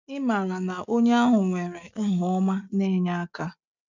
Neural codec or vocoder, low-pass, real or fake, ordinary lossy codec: none; 7.2 kHz; real; none